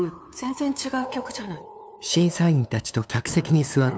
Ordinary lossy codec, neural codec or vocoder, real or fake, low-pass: none; codec, 16 kHz, 2 kbps, FunCodec, trained on LibriTTS, 25 frames a second; fake; none